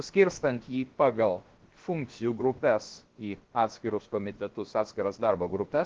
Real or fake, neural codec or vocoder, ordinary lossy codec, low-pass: fake; codec, 16 kHz, about 1 kbps, DyCAST, with the encoder's durations; Opus, 16 kbps; 7.2 kHz